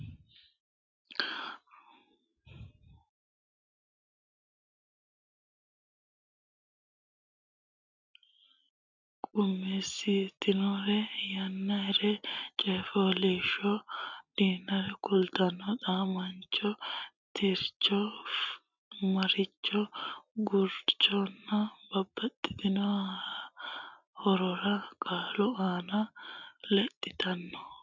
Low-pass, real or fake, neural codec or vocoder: 7.2 kHz; real; none